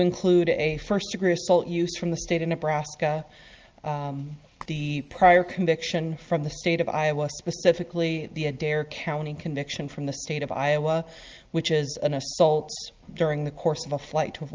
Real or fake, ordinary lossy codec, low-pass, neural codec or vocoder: real; Opus, 24 kbps; 7.2 kHz; none